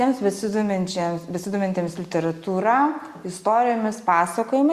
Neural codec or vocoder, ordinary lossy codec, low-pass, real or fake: none; Opus, 64 kbps; 14.4 kHz; real